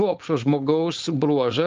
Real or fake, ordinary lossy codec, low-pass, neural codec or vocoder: fake; Opus, 32 kbps; 7.2 kHz; codec, 16 kHz, 4.8 kbps, FACodec